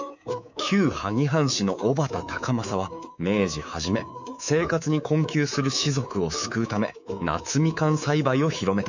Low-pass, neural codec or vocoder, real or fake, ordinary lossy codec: 7.2 kHz; codec, 24 kHz, 3.1 kbps, DualCodec; fake; none